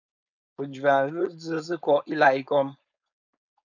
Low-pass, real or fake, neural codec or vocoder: 7.2 kHz; fake; codec, 16 kHz, 4.8 kbps, FACodec